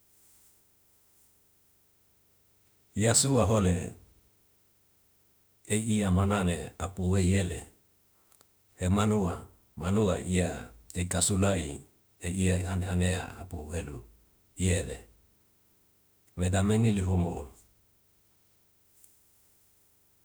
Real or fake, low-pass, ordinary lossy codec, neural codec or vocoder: fake; none; none; autoencoder, 48 kHz, 32 numbers a frame, DAC-VAE, trained on Japanese speech